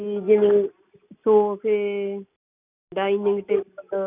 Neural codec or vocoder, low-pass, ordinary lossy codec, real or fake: none; 3.6 kHz; none; real